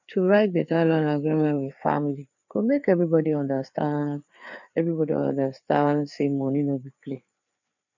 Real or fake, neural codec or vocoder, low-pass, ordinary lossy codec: fake; codec, 16 kHz, 4 kbps, FreqCodec, larger model; 7.2 kHz; none